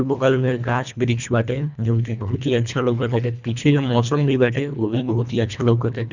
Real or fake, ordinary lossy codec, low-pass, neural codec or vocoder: fake; none; 7.2 kHz; codec, 24 kHz, 1.5 kbps, HILCodec